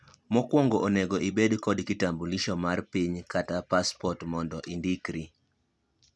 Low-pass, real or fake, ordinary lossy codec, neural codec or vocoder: none; real; none; none